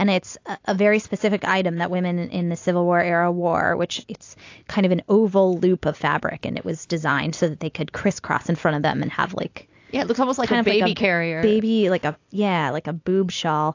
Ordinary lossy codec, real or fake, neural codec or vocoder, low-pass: AAC, 48 kbps; real; none; 7.2 kHz